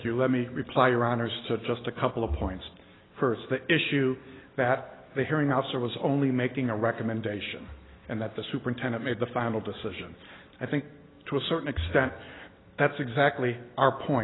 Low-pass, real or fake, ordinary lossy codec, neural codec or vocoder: 7.2 kHz; real; AAC, 16 kbps; none